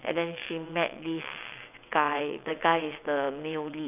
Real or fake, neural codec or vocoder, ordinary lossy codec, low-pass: fake; vocoder, 22.05 kHz, 80 mel bands, WaveNeXt; none; 3.6 kHz